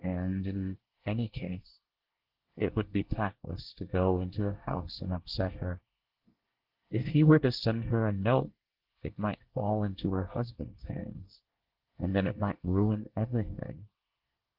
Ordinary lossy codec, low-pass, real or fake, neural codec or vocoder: Opus, 16 kbps; 5.4 kHz; fake; codec, 24 kHz, 1 kbps, SNAC